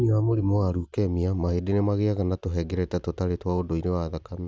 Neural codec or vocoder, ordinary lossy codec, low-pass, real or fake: none; none; none; real